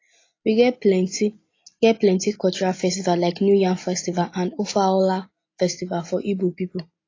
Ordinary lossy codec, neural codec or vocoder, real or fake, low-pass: AAC, 32 kbps; none; real; 7.2 kHz